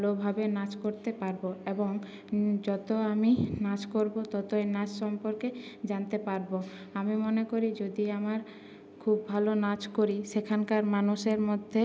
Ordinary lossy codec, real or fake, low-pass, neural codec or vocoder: none; real; none; none